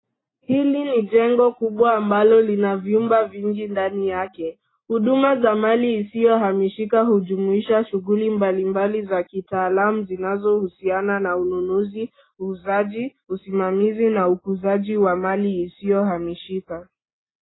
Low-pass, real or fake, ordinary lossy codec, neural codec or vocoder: 7.2 kHz; real; AAC, 16 kbps; none